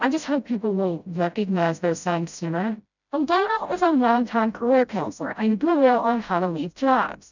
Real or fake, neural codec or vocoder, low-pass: fake; codec, 16 kHz, 0.5 kbps, FreqCodec, smaller model; 7.2 kHz